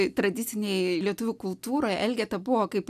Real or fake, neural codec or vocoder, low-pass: real; none; 14.4 kHz